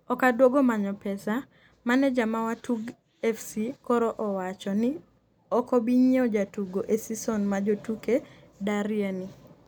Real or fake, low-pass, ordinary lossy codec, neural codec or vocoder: real; none; none; none